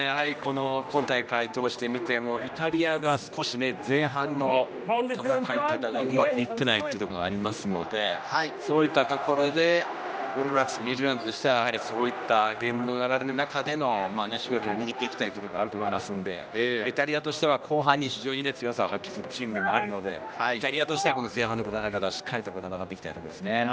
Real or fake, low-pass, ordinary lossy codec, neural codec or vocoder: fake; none; none; codec, 16 kHz, 1 kbps, X-Codec, HuBERT features, trained on general audio